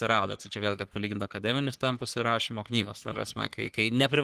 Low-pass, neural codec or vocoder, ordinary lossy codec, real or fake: 14.4 kHz; codec, 44.1 kHz, 3.4 kbps, Pupu-Codec; Opus, 24 kbps; fake